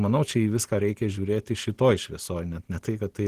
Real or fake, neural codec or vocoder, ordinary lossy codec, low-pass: real; none; Opus, 16 kbps; 14.4 kHz